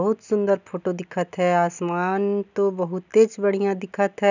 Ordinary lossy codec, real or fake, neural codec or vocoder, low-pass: none; real; none; 7.2 kHz